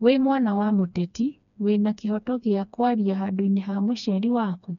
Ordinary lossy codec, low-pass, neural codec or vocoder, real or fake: none; 7.2 kHz; codec, 16 kHz, 2 kbps, FreqCodec, smaller model; fake